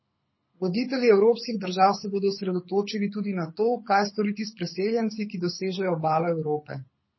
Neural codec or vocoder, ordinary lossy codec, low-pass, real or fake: codec, 24 kHz, 6 kbps, HILCodec; MP3, 24 kbps; 7.2 kHz; fake